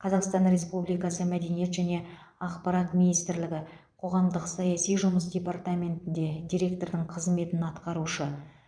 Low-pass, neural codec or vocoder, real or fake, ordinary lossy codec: 9.9 kHz; vocoder, 22.05 kHz, 80 mel bands, Vocos; fake; none